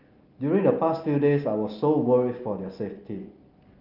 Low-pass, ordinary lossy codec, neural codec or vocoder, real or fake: 5.4 kHz; Opus, 32 kbps; none; real